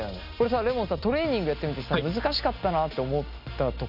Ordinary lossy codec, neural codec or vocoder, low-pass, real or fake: none; none; 5.4 kHz; real